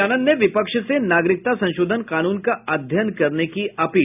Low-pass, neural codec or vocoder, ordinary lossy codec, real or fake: 3.6 kHz; none; none; real